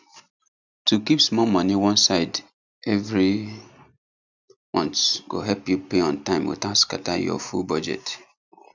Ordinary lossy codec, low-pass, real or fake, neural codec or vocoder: none; 7.2 kHz; real; none